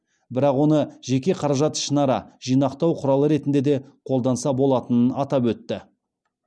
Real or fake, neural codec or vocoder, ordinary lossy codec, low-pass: real; none; none; none